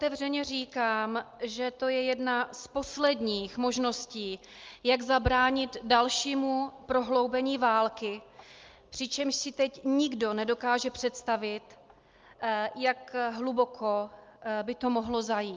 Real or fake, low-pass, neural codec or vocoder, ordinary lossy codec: real; 7.2 kHz; none; Opus, 32 kbps